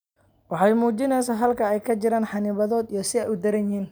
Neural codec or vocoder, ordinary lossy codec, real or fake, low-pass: none; none; real; none